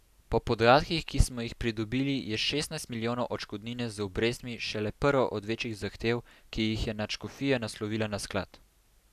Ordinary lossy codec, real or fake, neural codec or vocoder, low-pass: none; real; none; 14.4 kHz